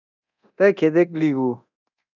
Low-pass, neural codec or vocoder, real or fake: 7.2 kHz; codec, 24 kHz, 0.9 kbps, DualCodec; fake